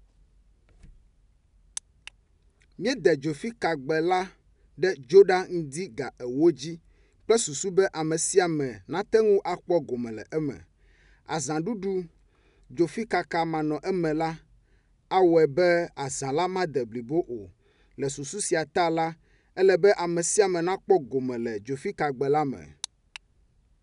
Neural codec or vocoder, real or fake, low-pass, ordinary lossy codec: none; real; 10.8 kHz; none